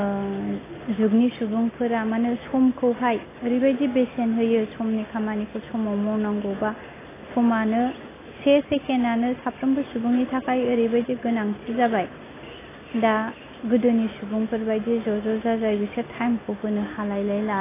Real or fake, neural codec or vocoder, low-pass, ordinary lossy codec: real; none; 3.6 kHz; AAC, 16 kbps